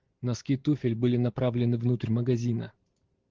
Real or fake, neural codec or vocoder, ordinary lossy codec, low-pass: real; none; Opus, 16 kbps; 7.2 kHz